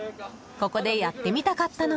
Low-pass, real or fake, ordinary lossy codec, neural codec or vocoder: none; real; none; none